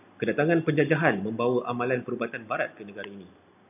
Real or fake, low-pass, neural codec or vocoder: real; 3.6 kHz; none